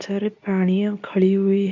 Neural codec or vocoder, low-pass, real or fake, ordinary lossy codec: codec, 24 kHz, 0.9 kbps, WavTokenizer, medium speech release version 2; 7.2 kHz; fake; none